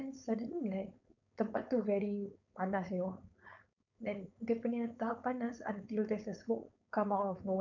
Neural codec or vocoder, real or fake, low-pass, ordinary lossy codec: codec, 16 kHz, 4.8 kbps, FACodec; fake; 7.2 kHz; none